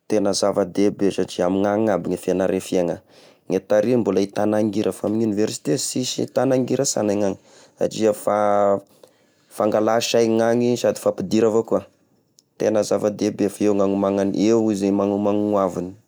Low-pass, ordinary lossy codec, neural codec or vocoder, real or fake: none; none; none; real